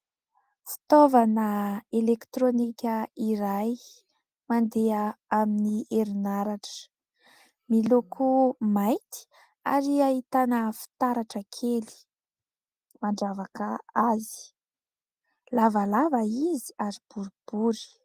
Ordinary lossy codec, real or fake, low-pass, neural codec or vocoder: Opus, 24 kbps; real; 14.4 kHz; none